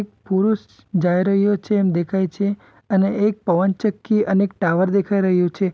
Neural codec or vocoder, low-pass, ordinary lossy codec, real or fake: none; none; none; real